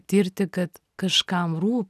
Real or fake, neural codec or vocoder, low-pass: real; none; 14.4 kHz